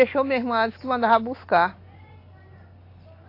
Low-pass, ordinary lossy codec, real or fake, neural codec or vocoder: 5.4 kHz; AAC, 32 kbps; real; none